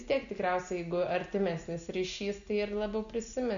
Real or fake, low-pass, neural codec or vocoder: real; 7.2 kHz; none